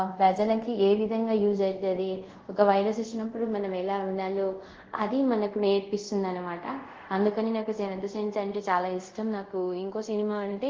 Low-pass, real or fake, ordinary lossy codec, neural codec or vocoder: 7.2 kHz; fake; Opus, 16 kbps; codec, 24 kHz, 0.5 kbps, DualCodec